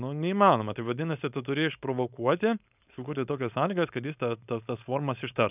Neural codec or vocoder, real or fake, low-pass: codec, 16 kHz, 4.8 kbps, FACodec; fake; 3.6 kHz